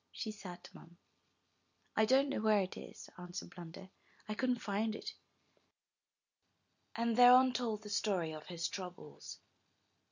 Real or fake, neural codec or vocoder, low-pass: real; none; 7.2 kHz